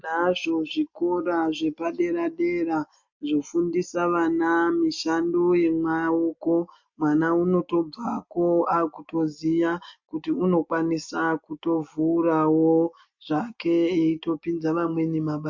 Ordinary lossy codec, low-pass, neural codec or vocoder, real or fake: MP3, 48 kbps; 7.2 kHz; none; real